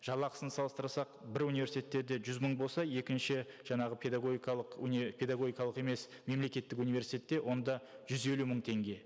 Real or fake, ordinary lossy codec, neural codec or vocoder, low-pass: real; none; none; none